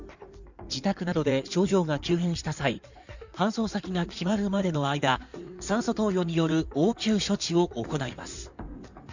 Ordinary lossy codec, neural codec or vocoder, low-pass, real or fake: none; codec, 16 kHz in and 24 kHz out, 2.2 kbps, FireRedTTS-2 codec; 7.2 kHz; fake